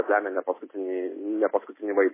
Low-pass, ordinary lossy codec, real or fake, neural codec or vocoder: 3.6 kHz; MP3, 16 kbps; real; none